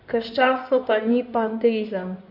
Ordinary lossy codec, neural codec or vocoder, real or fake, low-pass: none; codec, 16 kHz in and 24 kHz out, 2.2 kbps, FireRedTTS-2 codec; fake; 5.4 kHz